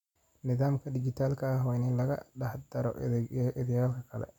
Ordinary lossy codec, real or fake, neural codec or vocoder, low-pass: none; real; none; 19.8 kHz